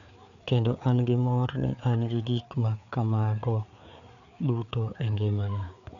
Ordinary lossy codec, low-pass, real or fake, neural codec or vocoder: none; 7.2 kHz; fake; codec, 16 kHz, 4 kbps, FreqCodec, larger model